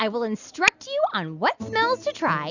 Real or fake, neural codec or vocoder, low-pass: real; none; 7.2 kHz